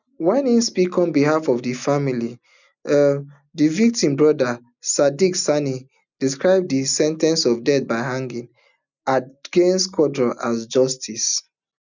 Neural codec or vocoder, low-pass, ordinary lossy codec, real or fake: none; 7.2 kHz; none; real